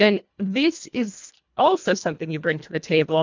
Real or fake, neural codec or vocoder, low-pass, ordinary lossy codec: fake; codec, 24 kHz, 1.5 kbps, HILCodec; 7.2 kHz; MP3, 64 kbps